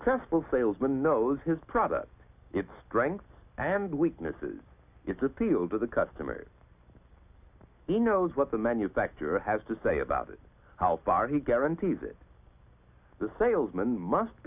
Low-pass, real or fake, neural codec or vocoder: 3.6 kHz; real; none